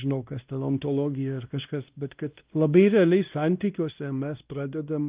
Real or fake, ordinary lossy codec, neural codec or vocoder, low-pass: fake; Opus, 24 kbps; codec, 16 kHz, 1 kbps, X-Codec, WavLM features, trained on Multilingual LibriSpeech; 3.6 kHz